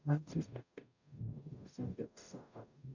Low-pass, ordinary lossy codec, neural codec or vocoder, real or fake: 7.2 kHz; none; codec, 44.1 kHz, 0.9 kbps, DAC; fake